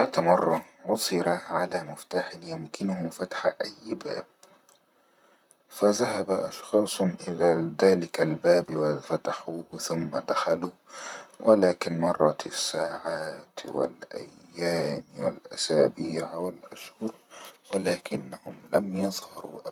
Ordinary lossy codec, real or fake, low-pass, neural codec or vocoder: none; fake; 19.8 kHz; vocoder, 44.1 kHz, 128 mel bands, Pupu-Vocoder